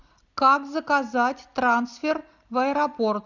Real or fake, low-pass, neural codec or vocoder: real; 7.2 kHz; none